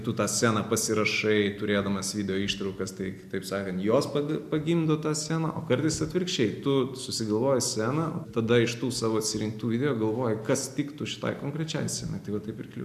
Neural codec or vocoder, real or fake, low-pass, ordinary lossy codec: none; real; 14.4 kHz; AAC, 96 kbps